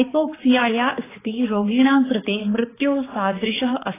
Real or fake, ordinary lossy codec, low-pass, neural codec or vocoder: fake; AAC, 16 kbps; 3.6 kHz; codec, 16 kHz, 4 kbps, X-Codec, HuBERT features, trained on balanced general audio